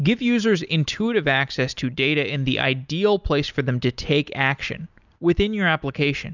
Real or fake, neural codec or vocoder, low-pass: real; none; 7.2 kHz